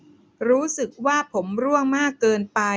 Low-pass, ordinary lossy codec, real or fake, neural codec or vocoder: none; none; real; none